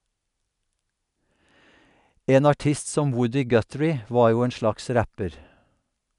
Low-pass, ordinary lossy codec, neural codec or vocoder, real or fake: 10.8 kHz; none; none; real